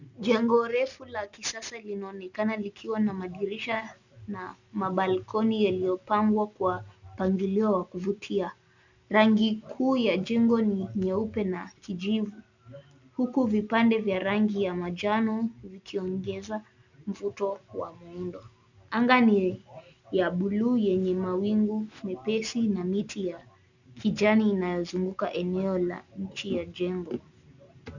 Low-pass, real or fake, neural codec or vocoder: 7.2 kHz; real; none